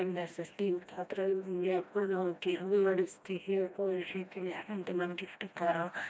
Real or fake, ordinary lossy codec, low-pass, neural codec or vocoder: fake; none; none; codec, 16 kHz, 1 kbps, FreqCodec, smaller model